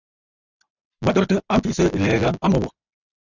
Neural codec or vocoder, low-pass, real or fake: none; 7.2 kHz; real